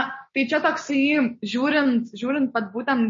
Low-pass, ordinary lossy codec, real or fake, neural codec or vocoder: 7.2 kHz; MP3, 32 kbps; real; none